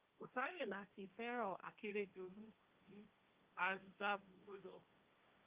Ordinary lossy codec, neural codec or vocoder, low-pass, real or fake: Opus, 24 kbps; codec, 16 kHz, 1.1 kbps, Voila-Tokenizer; 3.6 kHz; fake